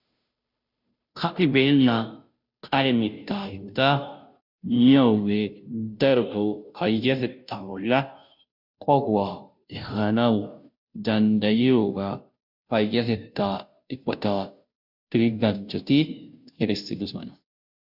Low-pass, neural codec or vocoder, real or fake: 5.4 kHz; codec, 16 kHz, 0.5 kbps, FunCodec, trained on Chinese and English, 25 frames a second; fake